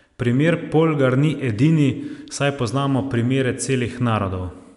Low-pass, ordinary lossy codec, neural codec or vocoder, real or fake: 10.8 kHz; none; none; real